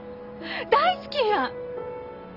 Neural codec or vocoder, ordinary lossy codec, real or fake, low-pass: none; none; real; 5.4 kHz